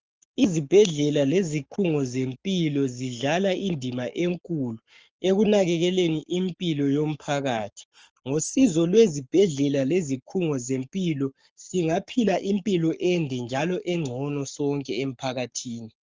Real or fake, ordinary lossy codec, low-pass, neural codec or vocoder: fake; Opus, 24 kbps; 7.2 kHz; codec, 44.1 kHz, 7.8 kbps, Pupu-Codec